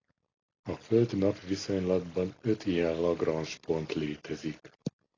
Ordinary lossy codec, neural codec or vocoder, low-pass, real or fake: AAC, 32 kbps; none; 7.2 kHz; real